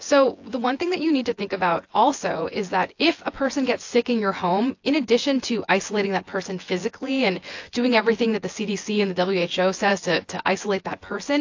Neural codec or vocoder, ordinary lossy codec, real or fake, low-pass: vocoder, 24 kHz, 100 mel bands, Vocos; AAC, 48 kbps; fake; 7.2 kHz